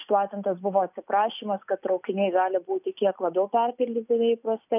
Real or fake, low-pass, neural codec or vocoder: real; 3.6 kHz; none